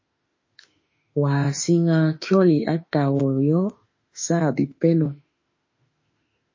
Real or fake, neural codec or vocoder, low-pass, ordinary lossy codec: fake; autoencoder, 48 kHz, 32 numbers a frame, DAC-VAE, trained on Japanese speech; 7.2 kHz; MP3, 32 kbps